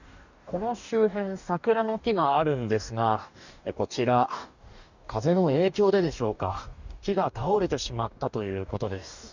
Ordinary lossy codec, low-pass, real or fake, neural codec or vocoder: none; 7.2 kHz; fake; codec, 44.1 kHz, 2.6 kbps, DAC